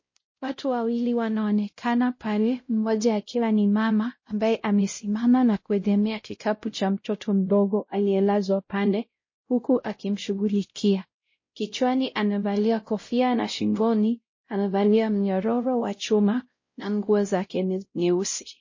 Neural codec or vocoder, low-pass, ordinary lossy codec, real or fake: codec, 16 kHz, 0.5 kbps, X-Codec, WavLM features, trained on Multilingual LibriSpeech; 7.2 kHz; MP3, 32 kbps; fake